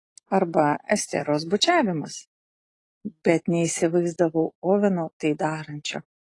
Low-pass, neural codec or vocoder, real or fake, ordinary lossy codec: 10.8 kHz; none; real; AAC, 32 kbps